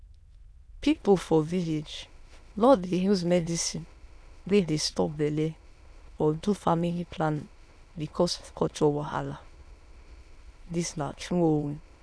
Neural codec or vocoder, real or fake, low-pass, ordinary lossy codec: autoencoder, 22.05 kHz, a latent of 192 numbers a frame, VITS, trained on many speakers; fake; none; none